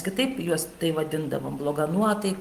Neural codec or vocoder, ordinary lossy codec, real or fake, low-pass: none; Opus, 24 kbps; real; 14.4 kHz